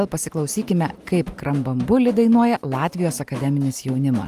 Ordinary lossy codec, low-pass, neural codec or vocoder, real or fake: Opus, 32 kbps; 14.4 kHz; none; real